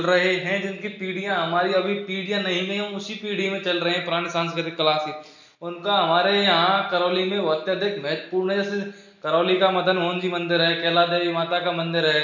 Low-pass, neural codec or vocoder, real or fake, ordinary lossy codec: 7.2 kHz; none; real; none